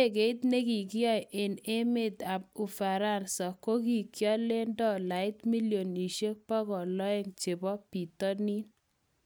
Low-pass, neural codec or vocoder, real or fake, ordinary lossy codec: none; none; real; none